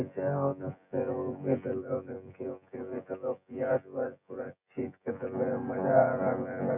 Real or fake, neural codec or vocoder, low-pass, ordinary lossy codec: fake; vocoder, 24 kHz, 100 mel bands, Vocos; 3.6 kHz; none